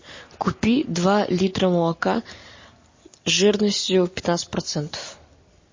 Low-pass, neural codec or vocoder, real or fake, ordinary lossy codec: 7.2 kHz; none; real; MP3, 32 kbps